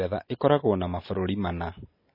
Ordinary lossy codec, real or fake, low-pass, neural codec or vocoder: MP3, 24 kbps; real; 5.4 kHz; none